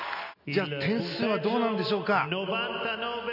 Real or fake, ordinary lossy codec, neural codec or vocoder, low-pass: real; none; none; 5.4 kHz